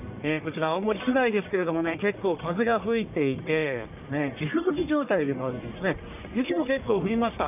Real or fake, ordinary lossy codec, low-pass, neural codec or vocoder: fake; none; 3.6 kHz; codec, 44.1 kHz, 1.7 kbps, Pupu-Codec